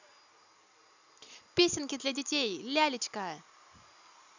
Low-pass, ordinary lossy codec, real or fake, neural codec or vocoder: 7.2 kHz; none; real; none